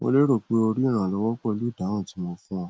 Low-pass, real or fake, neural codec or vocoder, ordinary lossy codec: none; real; none; none